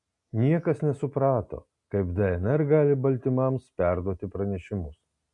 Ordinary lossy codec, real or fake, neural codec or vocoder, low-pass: MP3, 64 kbps; real; none; 10.8 kHz